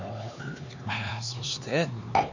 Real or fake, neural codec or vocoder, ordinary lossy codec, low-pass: fake; codec, 16 kHz, 4 kbps, X-Codec, HuBERT features, trained on LibriSpeech; none; 7.2 kHz